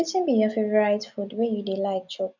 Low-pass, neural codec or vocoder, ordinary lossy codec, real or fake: 7.2 kHz; none; none; real